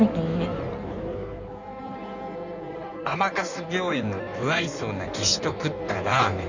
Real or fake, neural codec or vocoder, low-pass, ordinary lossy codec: fake; codec, 16 kHz in and 24 kHz out, 1.1 kbps, FireRedTTS-2 codec; 7.2 kHz; none